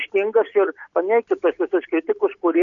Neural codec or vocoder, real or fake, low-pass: none; real; 7.2 kHz